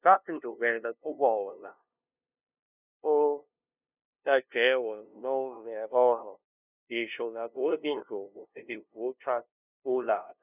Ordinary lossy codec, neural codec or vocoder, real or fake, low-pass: none; codec, 16 kHz, 0.5 kbps, FunCodec, trained on LibriTTS, 25 frames a second; fake; 3.6 kHz